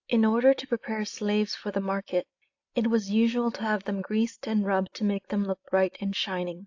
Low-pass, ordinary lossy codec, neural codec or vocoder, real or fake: 7.2 kHz; AAC, 48 kbps; none; real